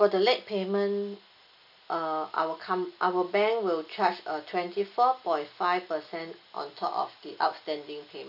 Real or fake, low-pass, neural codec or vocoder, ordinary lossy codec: real; 5.4 kHz; none; none